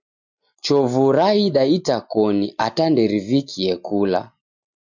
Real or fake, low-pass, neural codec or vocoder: real; 7.2 kHz; none